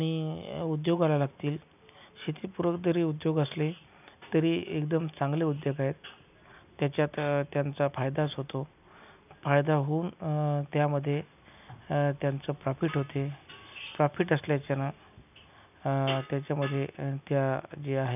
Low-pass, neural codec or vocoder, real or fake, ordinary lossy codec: 3.6 kHz; none; real; none